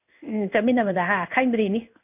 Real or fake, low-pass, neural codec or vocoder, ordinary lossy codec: fake; 3.6 kHz; codec, 16 kHz in and 24 kHz out, 1 kbps, XY-Tokenizer; none